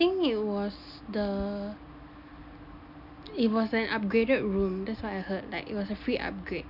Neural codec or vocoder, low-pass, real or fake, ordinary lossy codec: none; 5.4 kHz; real; none